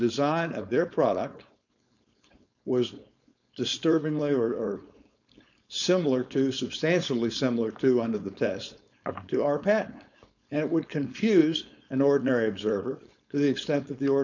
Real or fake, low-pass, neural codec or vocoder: fake; 7.2 kHz; codec, 16 kHz, 4.8 kbps, FACodec